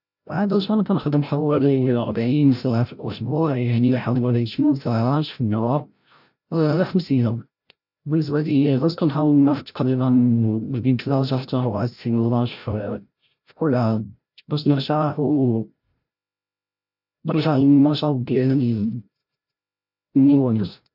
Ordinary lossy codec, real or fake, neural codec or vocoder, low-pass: none; fake; codec, 16 kHz, 0.5 kbps, FreqCodec, larger model; 5.4 kHz